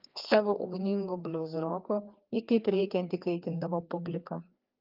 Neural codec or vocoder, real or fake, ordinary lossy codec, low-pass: codec, 16 kHz, 2 kbps, FreqCodec, larger model; fake; Opus, 24 kbps; 5.4 kHz